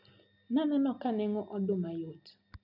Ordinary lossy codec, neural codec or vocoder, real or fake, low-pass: none; none; real; 5.4 kHz